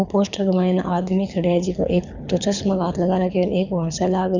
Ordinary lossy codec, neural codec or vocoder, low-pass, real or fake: none; codec, 24 kHz, 6 kbps, HILCodec; 7.2 kHz; fake